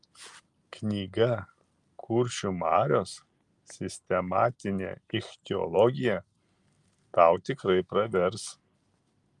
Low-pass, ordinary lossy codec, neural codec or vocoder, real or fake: 10.8 kHz; Opus, 32 kbps; none; real